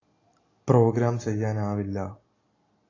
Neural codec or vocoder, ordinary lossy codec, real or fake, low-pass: none; AAC, 32 kbps; real; 7.2 kHz